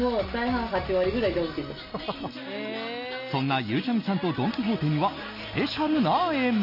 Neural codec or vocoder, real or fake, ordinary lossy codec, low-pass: none; real; none; 5.4 kHz